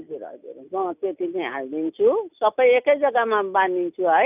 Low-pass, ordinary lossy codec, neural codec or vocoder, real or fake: 3.6 kHz; none; none; real